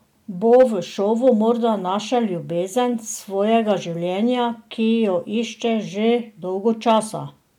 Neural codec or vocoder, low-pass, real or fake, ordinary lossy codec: none; 19.8 kHz; real; MP3, 96 kbps